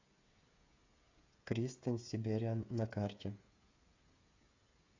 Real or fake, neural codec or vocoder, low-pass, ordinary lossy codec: fake; codec, 16 kHz, 8 kbps, FreqCodec, smaller model; 7.2 kHz; AAC, 48 kbps